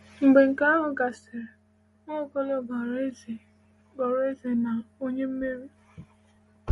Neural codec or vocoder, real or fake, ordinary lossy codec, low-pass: none; real; MP3, 48 kbps; 19.8 kHz